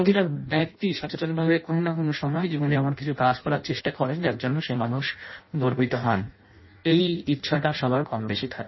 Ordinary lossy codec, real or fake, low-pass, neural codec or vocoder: MP3, 24 kbps; fake; 7.2 kHz; codec, 16 kHz in and 24 kHz out, 0.6 kbps, FireRedTTS-2 codec